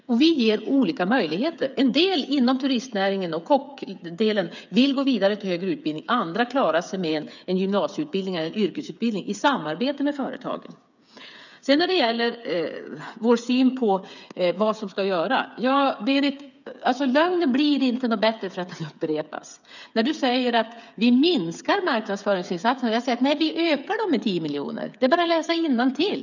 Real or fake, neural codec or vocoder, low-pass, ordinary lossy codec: fake; codec, 16 kHz, 8 kbps, FreqCodec, smaller model; 7.2 kHz; none